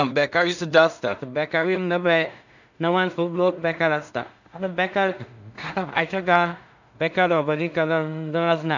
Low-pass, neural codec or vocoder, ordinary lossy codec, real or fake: 7.2 kHz; codec, 16 kHz in and 24 kHz out, 0.4 kbps, LongCat-Audio-Codec, two codebook decoder; none; fake